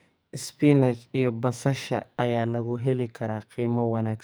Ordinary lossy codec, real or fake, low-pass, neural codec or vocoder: none; fake; none; codec, 44.1 kHz, 2.6 kbps, SNAC